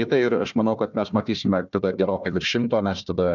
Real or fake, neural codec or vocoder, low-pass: fake; codec, 16 kHz, 1 kbps, FunCodec, trained on Chinese and English, 50 frames a second; 7.2 kHz